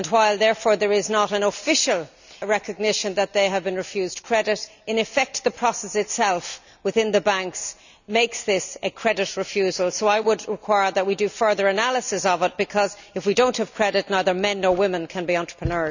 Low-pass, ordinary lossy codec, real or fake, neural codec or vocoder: 7.2 kHz; none; real; none